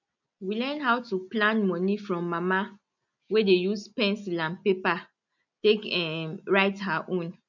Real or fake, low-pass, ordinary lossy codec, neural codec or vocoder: real; 7.2 kHz; none; none